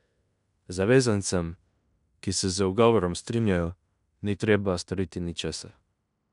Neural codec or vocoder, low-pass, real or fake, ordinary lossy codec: codec, 16 kHz in and 24 kHz out, 0.9 kbps, LongCat-Audio-Codec, fine tuned four codebook decoder; 10.8 kHz; fake; none